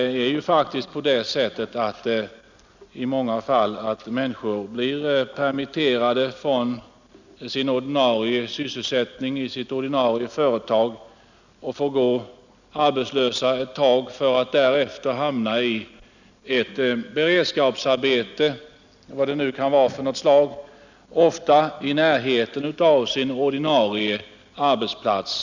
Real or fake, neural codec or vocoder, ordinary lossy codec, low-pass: real; none; none; 7.2 kHz